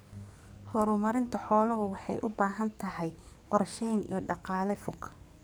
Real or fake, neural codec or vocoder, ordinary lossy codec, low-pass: fake; codec, 44.1 kHz, 2.6 kbps, SNAC; none; none